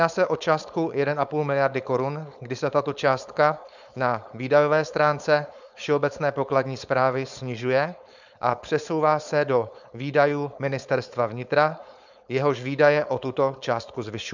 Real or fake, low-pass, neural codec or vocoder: fake; 7.2 kHz; codec, 16 kHz, 4.8 kbps, FACodec